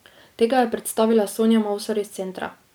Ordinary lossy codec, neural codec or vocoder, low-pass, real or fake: none; none; none; real